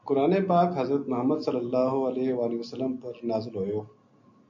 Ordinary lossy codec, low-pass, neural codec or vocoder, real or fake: MP3, 48 kbps; 7.2 kHz; none; real